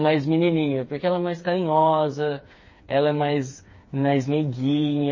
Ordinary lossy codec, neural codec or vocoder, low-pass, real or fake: MP3, 32 kbps; codec, 16 kHz, 4 kbps, FreqCodec, smaller model; 7.2 kHz; fake